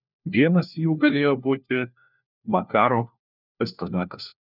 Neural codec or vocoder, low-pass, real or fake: codec, 16 kHz, 1 kbps, FunCodec, trained on LibriTTS, 50 frames a second; 5.4 kHz; fake